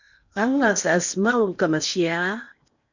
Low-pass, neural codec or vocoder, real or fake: 7.2 kHz; codec, 16 kHz in and 24 kHz out, 0.8 kbps, FocalCodec, streaming, 65536 codes; fake